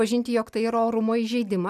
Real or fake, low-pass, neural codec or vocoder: real; 14.4 kHz; none